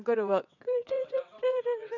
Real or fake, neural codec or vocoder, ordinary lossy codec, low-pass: fake; vocoder, 22.05 kHz, 80 mel bands, WaveNeXt; none; 7.2 kHz